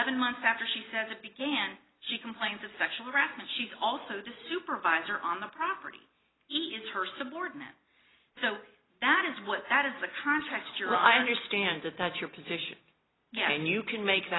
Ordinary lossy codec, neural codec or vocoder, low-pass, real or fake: AAC, 16 kbps; none; 7.2 kHz; real